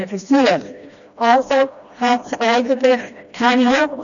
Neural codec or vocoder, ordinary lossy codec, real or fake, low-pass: codec, 16 kHz, 1 kbps, FreqCodec, smaller model; none; fake; 7.2 kHz